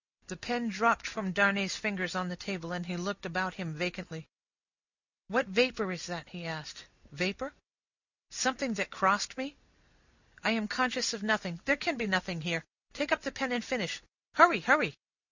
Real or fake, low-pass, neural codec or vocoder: real; 7.2 kHz; none